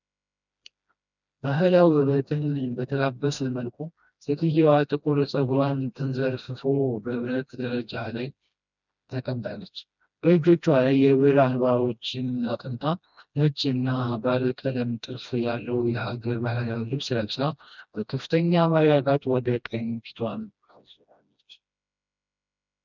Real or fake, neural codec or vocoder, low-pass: fake; codec, 16 kHz, 1 kbps, FreqCodec, smaller model; 7.2 kHz